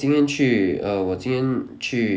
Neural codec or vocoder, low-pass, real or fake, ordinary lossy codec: none; none; real; none